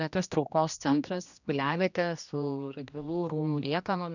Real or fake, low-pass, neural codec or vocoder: fake; 7.2 kHz; codec, 16 kHz, 1 kbps, X-Codec, HuBERT features, trained on general audio